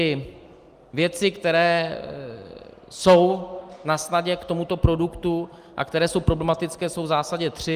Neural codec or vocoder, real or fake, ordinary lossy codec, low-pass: none; real; Opus, 32 kbps; 14.4 kHz